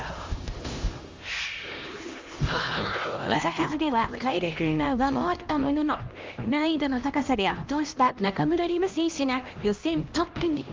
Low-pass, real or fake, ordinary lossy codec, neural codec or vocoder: 7.2 kHz; fake; Opus, 32 kbps; codec, 16 kHz, 1 kbps, X-Codec, HuBERT features, trained on LibriSpeech